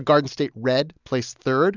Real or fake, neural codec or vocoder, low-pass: real; none; 7.2 kHz